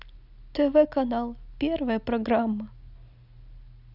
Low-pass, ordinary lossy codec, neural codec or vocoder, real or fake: 5.4 kHz; none; none; real